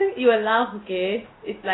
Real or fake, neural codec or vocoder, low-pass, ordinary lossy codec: real; none; 7.2 kHz; AAC, 16 kbps